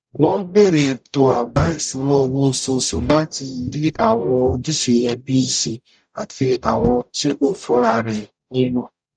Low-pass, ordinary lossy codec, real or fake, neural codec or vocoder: 9.9 kHz; none; fake; codec, 44.1 kHz, 0.9 kbps, DAC